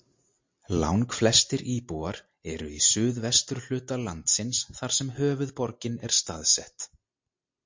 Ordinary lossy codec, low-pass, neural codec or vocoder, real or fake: MP3, 48 kbps; 7.2 kHz; none; real